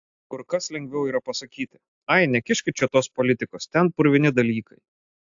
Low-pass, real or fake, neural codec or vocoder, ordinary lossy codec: 7.2 kHz; real; none; AAC, 64 kbps